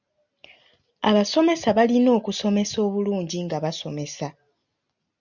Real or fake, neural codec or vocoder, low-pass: real; none; 7.2 kHz